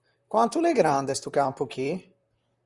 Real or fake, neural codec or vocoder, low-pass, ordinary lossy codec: fake; vocoder, 44.1 kHz, 128 mel bands, Pupu-Vocoder; 10.8 kHz; Opus, 64 kbps